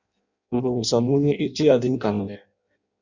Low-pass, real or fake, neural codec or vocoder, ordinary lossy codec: 7.2 kHz; fake; codec, 16 kHz in and 24 kHz out, 0.6 kbps, FireRedTTS-2 codec; Opus, 64 kbps